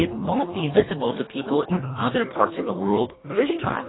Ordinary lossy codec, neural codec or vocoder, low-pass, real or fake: AAC, 16 kbps; codec, 24 kHz, 1.5 kbps, HILCodec; 7.2 kHz; fake